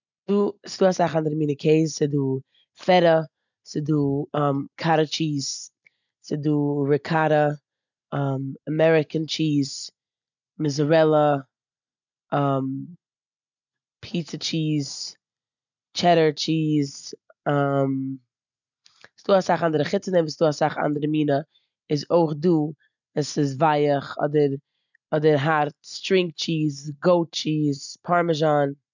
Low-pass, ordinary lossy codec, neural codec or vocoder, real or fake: 7.2 kHz; none; none; real